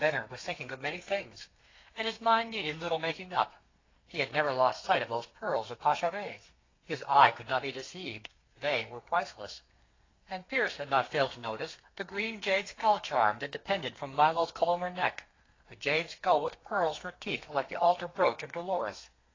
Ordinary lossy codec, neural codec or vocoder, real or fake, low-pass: AAC, 32 kbps; codec, 32 kHz, 1.9 kbps, SNAC; fake; 7.2 kHz